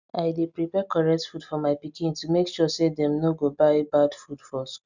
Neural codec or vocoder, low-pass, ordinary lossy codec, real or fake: none; 7.2 kHz; none; real